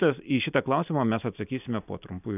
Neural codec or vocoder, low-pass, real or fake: none; 3.6 kHz; real